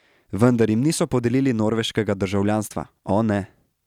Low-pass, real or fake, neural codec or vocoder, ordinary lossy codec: 19.8 kHz; real; none; none